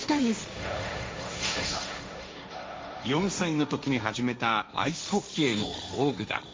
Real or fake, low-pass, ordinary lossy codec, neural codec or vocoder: fake; none; none; codec, 16 kHz, 1.1 kbps, Voila-Tokenizer